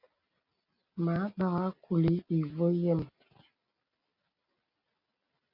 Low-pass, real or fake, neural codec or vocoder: 5.4 kHz; real; none